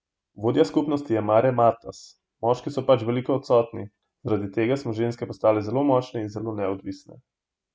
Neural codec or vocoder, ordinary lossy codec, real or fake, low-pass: none; none; real; none